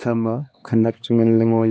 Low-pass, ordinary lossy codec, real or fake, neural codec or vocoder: none; none; fake; codec, 16 kHz, 2 kbps, X-Codec, HuBERT features, trained on LibriSpeech